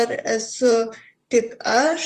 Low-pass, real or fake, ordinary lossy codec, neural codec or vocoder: 14.4 kHz; fake; Opus, 32 kbps; vocoder, 44.1 kHz, 128 mel bands, Pupu-Vocoder